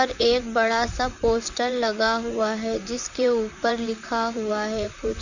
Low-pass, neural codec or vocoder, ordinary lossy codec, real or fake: 7.2 kHz; vocoder, 44.1 kHz, 128 mel bands, Pupu-Vocoder; none; fake